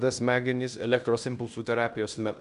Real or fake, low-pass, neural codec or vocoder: fake; 10.8 kHz; codec, 16 kHz in and 24 kHz out, 0.9 kbps, LongCat-Audio-Codec, fine tuned four codebook decoder